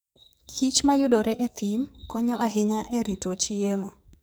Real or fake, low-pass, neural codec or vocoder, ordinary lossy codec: fake; none; codec, 44.1 kHz, 2.6 kbps, SNAC; none